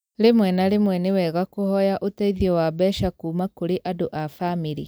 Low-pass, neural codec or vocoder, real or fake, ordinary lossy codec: none; none; real; none